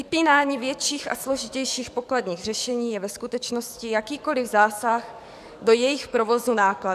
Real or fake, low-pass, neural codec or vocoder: fake; 14.4 kHz; codec, 44.1 kHz, 7.8 kbps, DAC